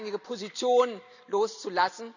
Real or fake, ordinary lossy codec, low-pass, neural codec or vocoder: real; none; 7.2 kHz; none